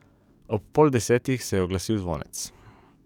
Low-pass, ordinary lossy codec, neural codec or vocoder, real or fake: 19.8 kHz; none; codec, 44.1 kHz, 7.8 kbps, DAC; fake